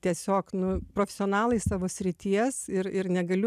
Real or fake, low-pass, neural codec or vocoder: real; 14.4 kHz; none